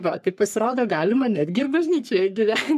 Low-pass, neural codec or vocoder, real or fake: 14.4 kHz; codec, 44.1 kHz, 3.4 kbps, Pupu-Codec; fake